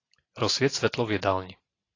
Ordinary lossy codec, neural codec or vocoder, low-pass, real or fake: AAC, 32 kbps; none; 7.2 kHz; real